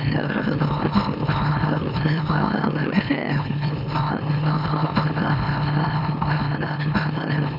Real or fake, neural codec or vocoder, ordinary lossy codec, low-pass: fake; autoencoder, 44.1 kHz, a latent of 192 numbers a frame, MeloTTS; none; 5.4 kHz